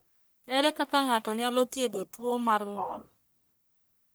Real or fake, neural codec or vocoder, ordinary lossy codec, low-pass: fake; codec, 44.1 kHz, 1.7 kbps, Pupu-Codec; none; none